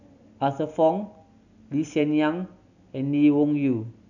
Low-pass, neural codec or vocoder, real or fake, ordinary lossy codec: 7.2 kHz; none; real; none